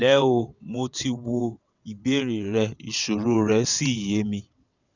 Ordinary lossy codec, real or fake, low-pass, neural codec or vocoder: none; fake; 7.2 kHz; vocoder, 22.05 kHz, 80 mel bands, WaveNeXt